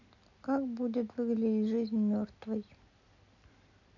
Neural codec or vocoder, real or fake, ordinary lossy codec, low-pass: none; real; none; 7.2 kHz